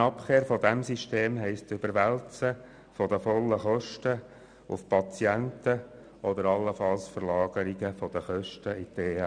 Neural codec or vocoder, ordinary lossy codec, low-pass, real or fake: none; none; 9.9 kHz; real